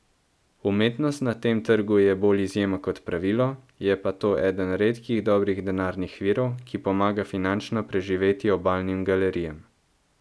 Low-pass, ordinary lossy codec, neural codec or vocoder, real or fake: none; none; none; real